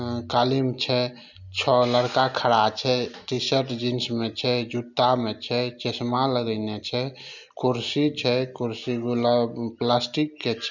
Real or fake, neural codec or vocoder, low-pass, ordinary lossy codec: real; none; 7.2 kHz; none